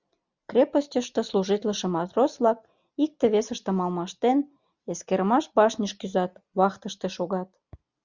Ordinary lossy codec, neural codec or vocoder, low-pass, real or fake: Opus, 64 kbps; none; 7.2 kHz; real